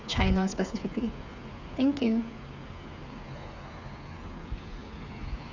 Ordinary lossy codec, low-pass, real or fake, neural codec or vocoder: none; 7.2 kHz; fake; codec, 16 kHz, 8 kbps, FreqCodec, smaller model